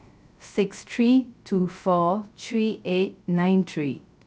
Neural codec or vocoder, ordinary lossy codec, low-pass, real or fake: codec, 16 kHz, 0.3 kbps, FocalCodec; none; none; fake